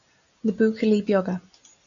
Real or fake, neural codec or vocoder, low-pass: real; none; 7.2 kHz